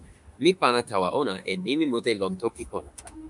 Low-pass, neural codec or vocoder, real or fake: 10.8 kHz; autoencoder, 48 kHz, 32 numbers a frame, DAC-VAE, trained on Japanese speech; fake